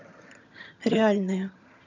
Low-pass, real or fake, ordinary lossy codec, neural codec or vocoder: 7.2 kHz; fake; none; vocoder, 22.05 kHz, 80 mel bands, HiFi-GAN